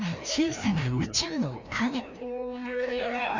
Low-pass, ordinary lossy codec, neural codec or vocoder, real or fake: 7.2 kHz; MP3, 64 kbps; codec, 16 kHz, 1 kbps, FreqCodec, larger model; fake